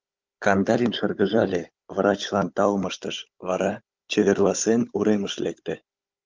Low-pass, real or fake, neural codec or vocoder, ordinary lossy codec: 7.2 kHz; fake; codec, 16 kHz, 16 kbps, FunCodec, trained on Chinese and English, 50 frames a second; Opus, 32 kbps